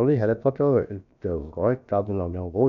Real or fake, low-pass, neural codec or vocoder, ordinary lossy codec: fake; 7.2 kHz; codec, 16 kHz, about 1 kbps, DyCAST, with the encoder's durations; none